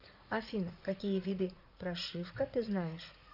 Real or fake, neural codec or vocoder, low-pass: fake; vocoder, 44.1 kHz, 80 mel bands, Vocos; 5.4 kHz